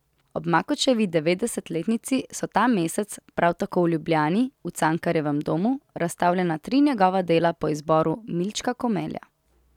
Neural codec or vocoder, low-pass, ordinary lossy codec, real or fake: none; 19.8 kHz; none; real